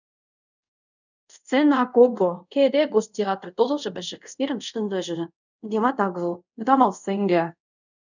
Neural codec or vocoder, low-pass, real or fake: codec, 24 kHz, 0.5 kbps, DualCodec; 7.2 kHz; fake